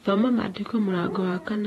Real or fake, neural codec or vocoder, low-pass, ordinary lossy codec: fake; vocoder, 48 kHz, 128 mel bands, Vocos; 19.8 kHz; AAC, 32 kbps